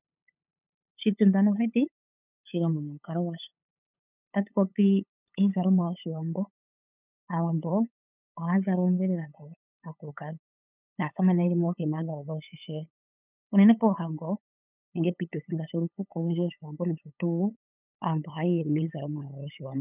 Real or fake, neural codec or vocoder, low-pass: fake; codec, 16 kHz, 8 kbps, FunCodec, trained on LibriTTS, 25 frames a second; 3.6 kHz